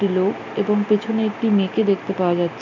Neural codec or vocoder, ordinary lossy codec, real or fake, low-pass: none; none; real; 7.2 kHz